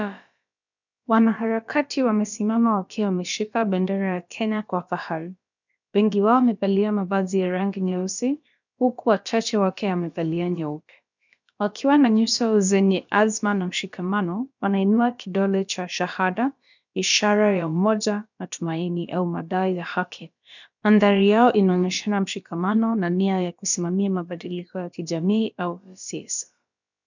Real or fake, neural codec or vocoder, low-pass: fake; codec, 16 kHz, about 1 kbps, DyCAST, with the encoder's durations; 7.2 kHz